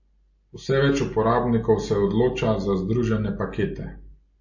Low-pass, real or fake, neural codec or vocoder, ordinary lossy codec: 7.2 kHz; real; none; MP3, 32 kbps